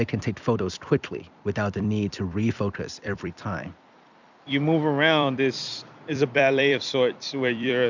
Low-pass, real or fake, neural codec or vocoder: 7.2 kHz; fake; vocoder, 44.1 kHz, 128 mel bands every 256 samples, BigVGAN v2